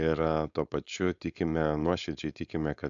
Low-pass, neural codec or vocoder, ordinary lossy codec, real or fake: 7.2 kHz; codec, 16 kHz, 4.8 kbps, FACodec; MP3, 64 kbps; fake